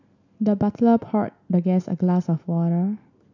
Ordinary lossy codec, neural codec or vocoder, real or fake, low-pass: none; none; real; 7.2 kHz